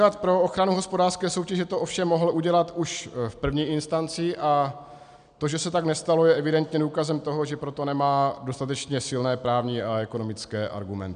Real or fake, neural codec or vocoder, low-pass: real; none; 9.9 kHz